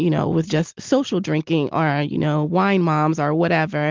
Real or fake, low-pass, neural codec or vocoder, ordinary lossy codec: real; 7.2 kHz; none; Opus, 32 kbps